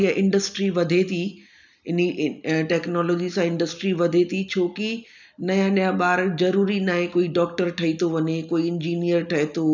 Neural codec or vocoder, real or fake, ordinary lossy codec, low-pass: none; real; none; 7.2 kHz